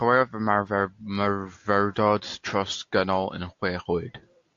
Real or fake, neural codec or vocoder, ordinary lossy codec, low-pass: real; none; AAC, 48 kbps; 7.2 kHz